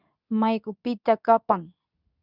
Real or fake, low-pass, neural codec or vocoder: fake; 5.4 kHz; codec, 24 kHz, 0.9 kbps, WavTokenizer, medium speech release version 2